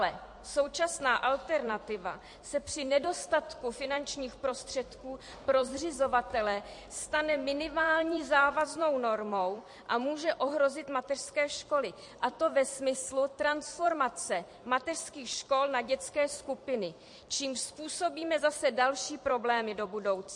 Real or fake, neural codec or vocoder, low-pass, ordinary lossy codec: fake; vocoder, 44.1 kHz, 128 mel bands every 256 samples, BigVGAN v2; 10.8 kHz; MP3, 48 kbps